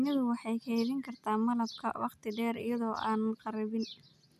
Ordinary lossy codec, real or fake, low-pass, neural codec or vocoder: none; real; 14.4 kHz; none